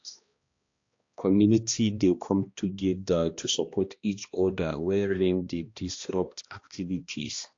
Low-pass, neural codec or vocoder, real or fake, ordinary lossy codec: 7.2 kHz; codec, 16 kHz, 1 kbps, X-Codec, HuBERT features, trained on balanced general audio; fake; AAC, 48 kbps